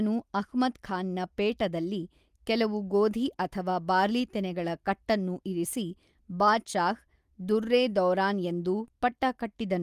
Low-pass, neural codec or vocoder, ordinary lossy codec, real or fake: 14.4 kHz; none; Opus, 32 kbps; real